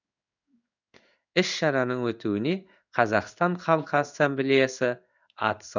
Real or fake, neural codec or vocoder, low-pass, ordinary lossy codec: fake; codec, 16 kHz in and 24 kHz out, 1 kbps, XY-Tokenizer; 7.2 kHz; none